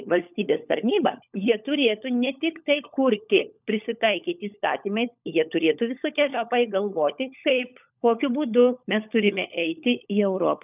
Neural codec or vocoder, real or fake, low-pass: codec, 16 kHz, 16 kbps, FunCodec, trained on LibriTTS, 50 frames a second; fake; 3.6 kHz